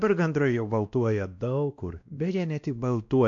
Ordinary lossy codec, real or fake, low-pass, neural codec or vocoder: AAC, 64 kbps; fake; 7.2 kHz; codec, 16 kHz, 1 kbps, X-Codec, WavLM features, trained on Multilingual LibriSpeech